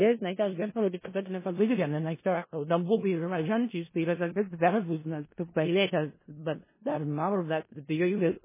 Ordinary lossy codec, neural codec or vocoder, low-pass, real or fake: MP3, 16 kbps; codec, 16 kHz in and 24 kHz out, 0.4 kbps, LongCat-Audio-Codec, four codebook decoder; 3.6 kHz; fake